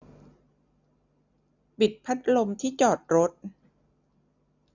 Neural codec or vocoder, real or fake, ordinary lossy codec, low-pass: none; real; none; 7.2 kHz